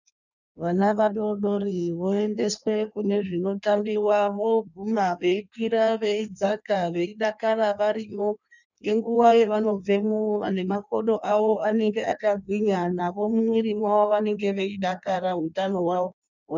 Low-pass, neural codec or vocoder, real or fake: 7.2 kHz; codec, 16 kHz in and 24 kHz out, 1.1 kbps, FireRedTTS-2 codec; fake